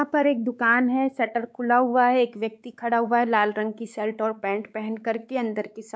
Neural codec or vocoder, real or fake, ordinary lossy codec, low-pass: codec, 16 kHz, 4 kbps, X-Codec, WavLM features, trained on Multilingual LibriSpeech; fake; none; none